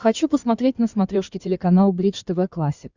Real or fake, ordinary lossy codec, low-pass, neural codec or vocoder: fake; Opus, 64 kbps; 7.2 kHz; codec, 16 kHz in and 24 kHz out, 2.2 kbps, FireRedTTS-2 codec